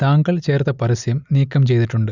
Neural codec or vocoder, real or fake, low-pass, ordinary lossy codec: none; real; 7.2 kHz; none